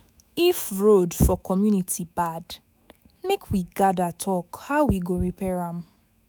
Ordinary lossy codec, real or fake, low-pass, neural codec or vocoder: none; fake; none; autoencoder, 48 kHz, 128 numbers a frame, DAC-VAE, trained on Japanese speech